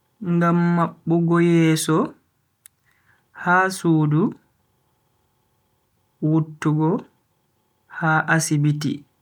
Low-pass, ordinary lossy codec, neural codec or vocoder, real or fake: 19.8 kHz; none; none; real